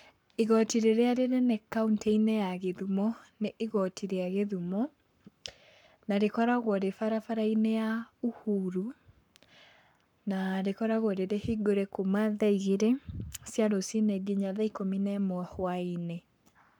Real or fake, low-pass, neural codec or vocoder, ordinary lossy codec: fake; 19.8 kHz; codec, 44.1 kHz, 7.8 kbps, Pupu-Codec; none